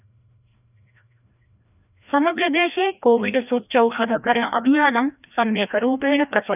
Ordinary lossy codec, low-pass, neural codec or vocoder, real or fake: none; 3.6 kHz; codec, 16 kHz, 1 kbps, FreqCodec, larger model; fake